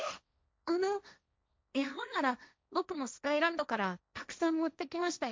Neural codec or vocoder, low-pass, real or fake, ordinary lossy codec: codec, 16 kHz, 1.1 kbps, Voila-Tokenizer; none; fake; none